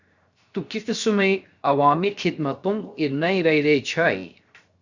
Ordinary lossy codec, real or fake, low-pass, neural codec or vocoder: Opus, 64 kbps; fake; 7.2 kHz; codec, 16 kHz, 0.7 kbps, FocalCodec